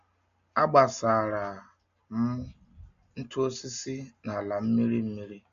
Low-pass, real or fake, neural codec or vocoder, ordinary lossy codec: 7.2 kHz; real; none; AAC, 96 kbps